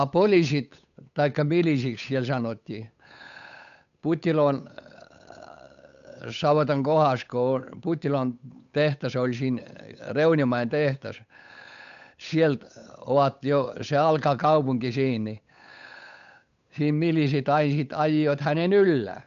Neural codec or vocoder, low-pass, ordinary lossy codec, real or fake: codec, 16 kHz, 8 kbps, FunCodec, trained on Chinese and English, 25 frames a second; 7.2 kHz; none; fake